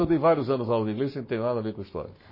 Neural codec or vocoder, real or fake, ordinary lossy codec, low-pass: codec, 44.1 kHz, 7.8 kbps, Pupu-Codec; fake; MP3, 24 kbps; 5.4 kHz